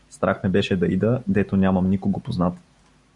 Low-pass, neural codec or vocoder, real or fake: 10.8 kHz; none; real